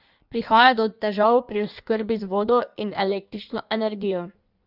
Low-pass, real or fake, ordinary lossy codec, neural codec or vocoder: 5.4 kHz; fake; none; codec, 16 kHz in and 24 kHz out, 1.1 kbps, FireRedTTS-2 codec